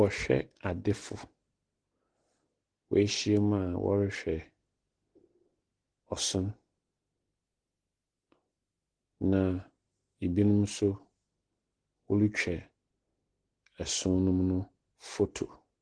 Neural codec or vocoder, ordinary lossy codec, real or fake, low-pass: none; Opus, 16 kbps; real; 9.9 kHz